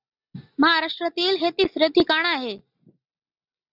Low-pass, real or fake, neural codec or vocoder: 5.4 kHz; real; none